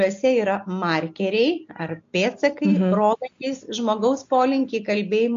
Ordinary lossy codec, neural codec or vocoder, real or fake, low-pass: MP3, 48 kbps; none; real; 7.2 kHz